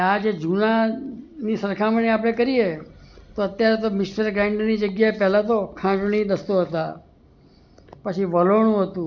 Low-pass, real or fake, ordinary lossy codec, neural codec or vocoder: 7.2 kHz; real; none; none